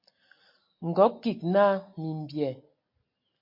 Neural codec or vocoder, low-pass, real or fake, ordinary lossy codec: none; 5.4 kHz; real; MP3, 32 kbps